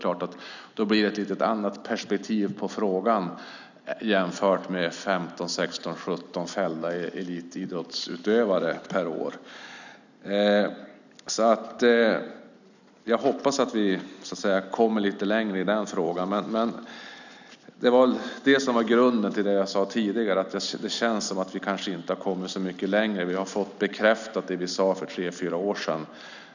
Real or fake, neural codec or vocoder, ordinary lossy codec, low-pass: real; none; none; 7.2 kHz